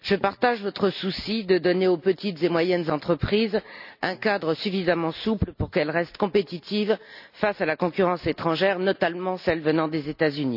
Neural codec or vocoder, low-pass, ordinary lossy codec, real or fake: none; 5.4 kHz; none; real